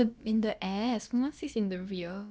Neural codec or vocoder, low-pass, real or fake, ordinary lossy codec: codec, 16 kHz, about 1 kbps, DyCAST, with the encoder's durations; none; fake; none